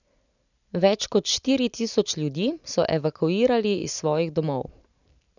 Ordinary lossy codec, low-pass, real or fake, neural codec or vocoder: none; 7.2 kHz; real; none